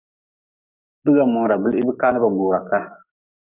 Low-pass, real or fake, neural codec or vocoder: 3.6 kHz; fake; codec, 44.1 kHz, 7.8 kbps, DAC